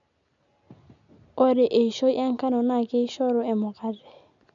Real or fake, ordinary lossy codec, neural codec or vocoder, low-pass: real; none; none; 7.2 kHz